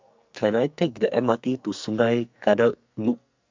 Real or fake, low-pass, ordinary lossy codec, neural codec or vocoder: fake; 7.2 kHz; MP3, 64 kbps; codec, 44.1 kHz, 2.6 kbps, SNAC